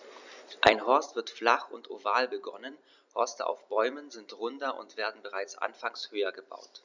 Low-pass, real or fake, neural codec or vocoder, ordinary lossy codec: 7.2 kHz; fake; vocoder, 44.1 kHz, 128 mel bands every 256 samples, BigVGAN v2; none